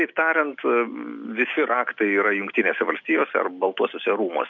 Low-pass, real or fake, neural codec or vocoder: 7.2 kHz; real; none